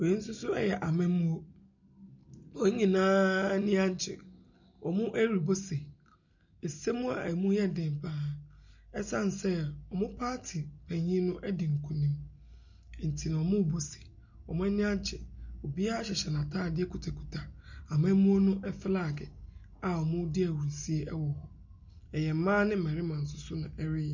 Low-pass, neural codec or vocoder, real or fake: 7.2 kHz; none; real